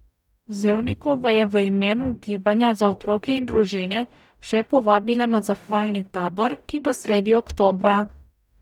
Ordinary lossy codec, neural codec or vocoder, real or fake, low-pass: none; codec, 44.1 kHz, 0.9 kbps, DAC; fake; 19.8 kHz